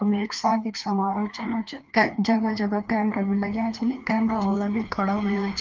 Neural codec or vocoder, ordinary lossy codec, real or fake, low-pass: codec, 16 kHz, 2 kbps, FreqCodec, larger model; Opus, 24 kbps; fake; 7.2 kHz